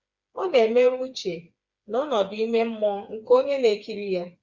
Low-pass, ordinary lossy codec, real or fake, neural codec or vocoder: 7.2 kHz; Opus, 64 kbps; fake; codec, 16 kHz, 4 kbps, FreqCodec, smaller model